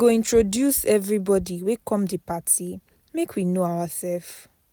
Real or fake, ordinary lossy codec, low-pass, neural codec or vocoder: real; none; none; none